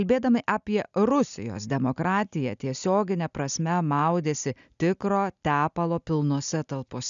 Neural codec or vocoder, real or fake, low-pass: none; real; 7.2 kHz